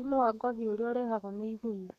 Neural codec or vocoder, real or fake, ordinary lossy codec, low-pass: codec, 32 kHz, 1.9 kbps, SNAC; fake; none; 14.4 kHz